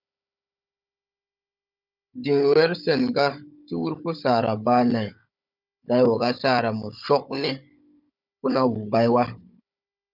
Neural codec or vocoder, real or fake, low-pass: codec, 16 kHz, 16 kbps, FunCodec, trained on Chinese and English, 50 frames a second; fake; 5.4 kHz